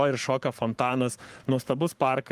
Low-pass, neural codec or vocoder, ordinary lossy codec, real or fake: 14.4 kHz; codec, 44.1 kHz, 7.8 kbps, Pupu-Codec; Opus, 32 kbps; fake